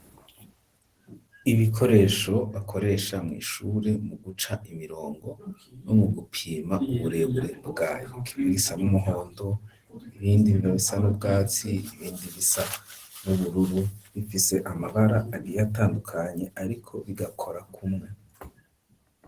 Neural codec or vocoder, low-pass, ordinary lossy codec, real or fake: none; 14.4 kHz; Opus, 16 kbps; real